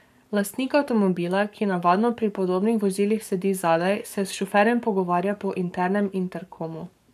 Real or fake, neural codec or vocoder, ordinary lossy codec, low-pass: fake; codec, 44.1 kHz, 7.8 kbps, Pupu-Codec; MP3, 96 kbps; 14.4 kHz